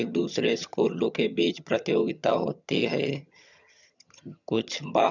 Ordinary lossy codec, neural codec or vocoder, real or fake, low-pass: none; vocoder, 22.05 kHz, 80 mel bands, HiFi-GAN; fake; 7.2 kHz